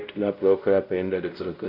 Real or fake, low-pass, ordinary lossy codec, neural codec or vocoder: fake; 5.4 kHz; none; codec, 16 kHz, 0.5 kbps, X-Codec, WavLM features, trained on Multilingual LibriSpeech